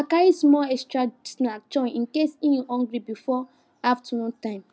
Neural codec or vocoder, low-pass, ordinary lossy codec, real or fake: none; none; none; real